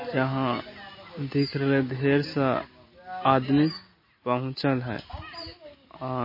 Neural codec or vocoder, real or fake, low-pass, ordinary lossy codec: none; real; 5.4 kHz; MP3, 24 kbps